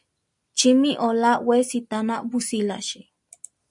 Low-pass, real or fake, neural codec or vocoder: 10.8 kHz; real; none